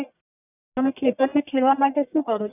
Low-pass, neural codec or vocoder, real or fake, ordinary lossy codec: 3.6 kHz; codec, 44.1 kHz, 1.7 kbps, Pupu-Codec; fake; none